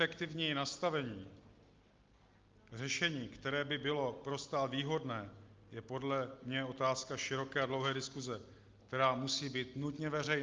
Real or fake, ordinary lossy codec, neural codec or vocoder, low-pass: real; Opus, 24 kbps; none; 7.2 kHz